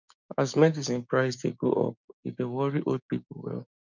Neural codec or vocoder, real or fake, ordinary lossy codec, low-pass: codec, 16 kHz, 6 kbps, DAC; fake; none; 7.2 kHz